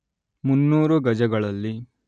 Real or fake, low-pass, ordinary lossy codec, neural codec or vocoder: real; 9.9 kHz; none; none